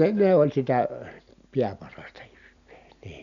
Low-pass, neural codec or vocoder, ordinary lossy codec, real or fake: 7.2 kHz; none; none; real